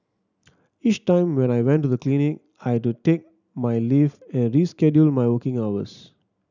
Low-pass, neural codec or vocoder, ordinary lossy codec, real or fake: 7.2 kHz; none; none; real